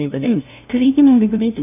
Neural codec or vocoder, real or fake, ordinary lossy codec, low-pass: codec, 16 kHz, 0.5 kbps, FunCodec, trained on LibriTTS, 25 frames a second; fake; none; 3.6 kHz